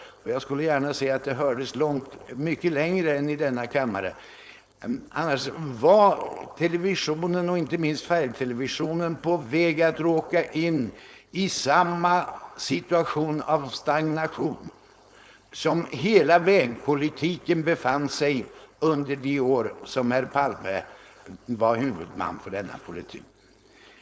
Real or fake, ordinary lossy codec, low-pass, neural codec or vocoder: fake; none; none; codec, 16 kHz, 4.8 kbps, FACodec